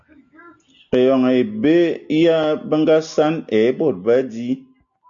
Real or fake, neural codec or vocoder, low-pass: real; none; 7.2 kHz